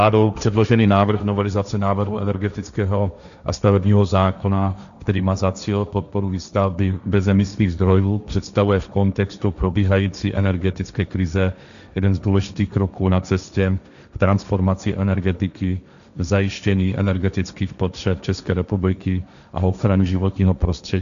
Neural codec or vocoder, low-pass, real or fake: codec, 16 kHz, 1.1 kbps, Voila-Tokenizer; 7.2 kHz; fake